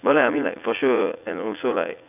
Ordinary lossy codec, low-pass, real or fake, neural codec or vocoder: none; 3.6 kHz; fake; vocoder, 44.1 kHz, 80 mel bands, Vocos